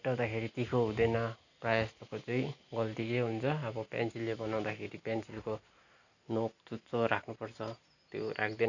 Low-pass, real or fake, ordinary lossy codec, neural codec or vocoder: 7.2 kHz; real; none; none